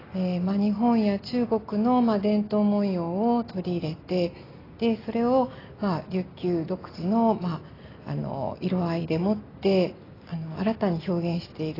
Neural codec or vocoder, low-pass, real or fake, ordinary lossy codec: none; 5.4 kHz; real; AAC, 24 kbps